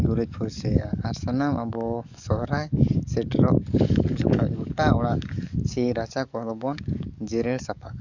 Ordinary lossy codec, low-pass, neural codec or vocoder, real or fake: none; 7.2 kHz; codec, 44.1 kHz, 7.8 kbps, DAC; fake